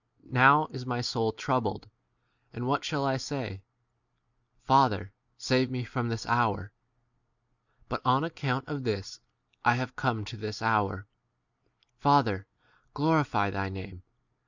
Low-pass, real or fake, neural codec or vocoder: 7.2 kHz; real; none